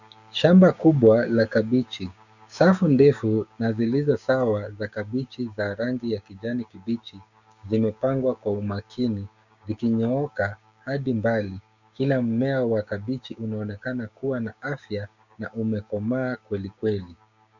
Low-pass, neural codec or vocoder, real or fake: 7.2 kHz; codec, 16 kHz, 6 kbps, DAC; fake